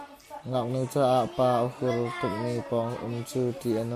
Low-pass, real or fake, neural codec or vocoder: 14.4 kHz; real; none